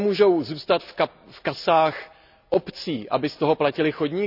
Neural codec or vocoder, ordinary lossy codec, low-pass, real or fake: none; none; 5.4 kHz; real